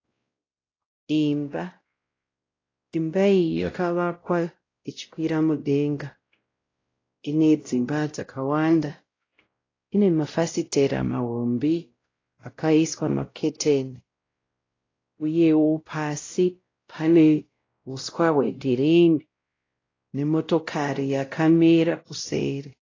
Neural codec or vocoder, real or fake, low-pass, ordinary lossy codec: codec, 16 kHz, 0.5 kbps, X-Codec, WavLM features, trained on Multilingual LibriSpeech; fake; 7.2 kHz; AAC, 32 kbps